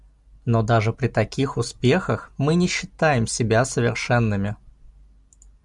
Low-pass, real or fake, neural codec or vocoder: 10.8 kHz; real; none